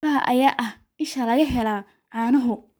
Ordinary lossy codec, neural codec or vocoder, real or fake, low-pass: none; codec, 44.1 kHz, 7.8 kbps, Pupu-Codec; fake; none